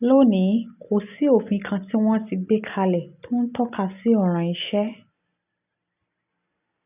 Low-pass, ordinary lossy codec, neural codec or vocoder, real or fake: 3.6 kHz; none; none; real